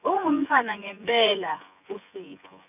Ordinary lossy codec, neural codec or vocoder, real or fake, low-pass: none; vocoder, 24 kHz, 100 mel bands, Vocos; fake; 3.6 kHz